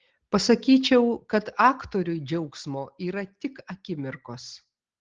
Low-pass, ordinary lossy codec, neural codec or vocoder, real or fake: 7.2 kHz; Opus, 16 kbps; none; real